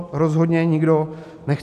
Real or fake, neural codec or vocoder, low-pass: real; none; 14.4 kHz